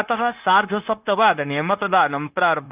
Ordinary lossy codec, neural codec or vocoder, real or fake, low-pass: Opus, 24 kbps; codec, 16 kHz, 0.9 kbps, LongCat-Audio-Codec; fake; 3.6 kHz